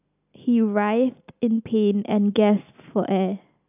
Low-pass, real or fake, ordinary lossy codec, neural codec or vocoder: 3.6 kHz; real; AAC, 32 kbps; none